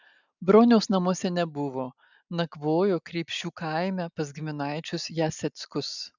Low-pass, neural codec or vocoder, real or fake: 7.2 kHz; none; real